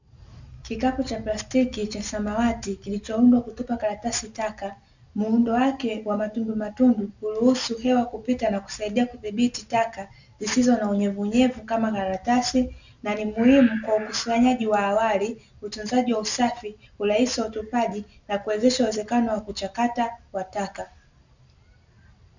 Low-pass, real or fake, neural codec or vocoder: 7.2 kHz; real; none